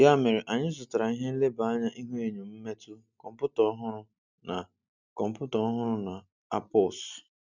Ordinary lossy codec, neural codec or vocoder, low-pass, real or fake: none; none; 7.2 kHz; real